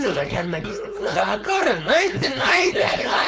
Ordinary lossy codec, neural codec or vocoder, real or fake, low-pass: none; codec, 16 kHz, 4.8 kbps, FACodec; fake; none